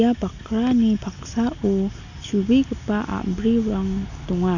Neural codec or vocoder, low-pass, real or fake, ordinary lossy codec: none; 7.2 kHz; real; none